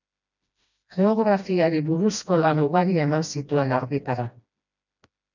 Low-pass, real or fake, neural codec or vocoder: 7.2 kHz; fake; codec, 16 kHz, 1 kbps, FreqCodec, smaller model